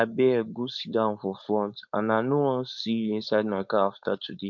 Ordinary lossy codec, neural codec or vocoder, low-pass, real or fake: none; codec, 16 kHz, 4.8 kbps, FACodec; 7.2 kHz; fake